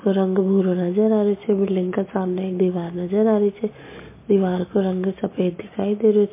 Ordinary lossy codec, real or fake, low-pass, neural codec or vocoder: MP3, 24 kbps; real; 3.6 kHz; none